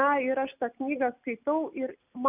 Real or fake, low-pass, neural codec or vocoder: real; 3.6 kHz; none